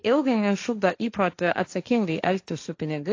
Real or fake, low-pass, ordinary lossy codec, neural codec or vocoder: fake; 7.2 kHz; AAC, 48 kbps; codec, 16 kHz, 1.1 kbps, Voila-Tokenizer